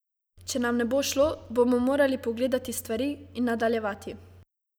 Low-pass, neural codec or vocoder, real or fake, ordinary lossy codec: none; none; real; none